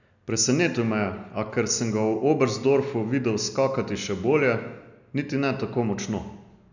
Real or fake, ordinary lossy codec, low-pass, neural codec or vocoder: real; none; 7.2 kHz; none